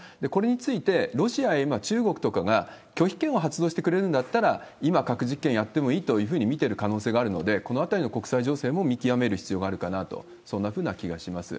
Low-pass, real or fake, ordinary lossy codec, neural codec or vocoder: none; real; none; none